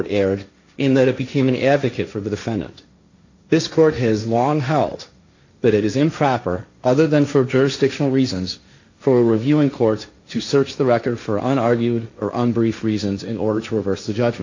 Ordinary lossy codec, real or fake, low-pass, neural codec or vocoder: AAC, 48 kbps; fake; 7.2 kHz; codec, 16 kHz, 1.1 kbps, Voila-Tokenizer